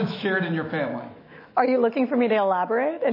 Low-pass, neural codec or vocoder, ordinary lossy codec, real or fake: 5.4 kHz; autoencoder, 48 kHz, 128 numbers a frame, DAC-VAE, trained on Japanese speech; MP3, 24 kbps; fake